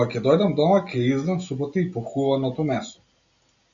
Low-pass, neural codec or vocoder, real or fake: 7.2 kHz; none; real